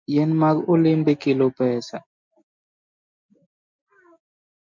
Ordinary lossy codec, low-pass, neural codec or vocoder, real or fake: MP3, 64 kbps; 7.2 kHz; none; real